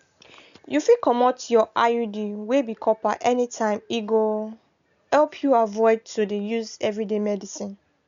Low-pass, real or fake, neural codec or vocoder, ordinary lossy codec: 7.2 kHz; real; none; MP3, 96 kbps